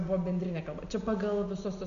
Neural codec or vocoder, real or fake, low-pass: none; real; 7.2 kHz